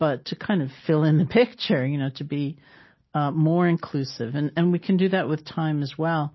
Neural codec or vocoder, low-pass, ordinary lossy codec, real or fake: none; 7.2 kHz; MP3, 24 kbps; real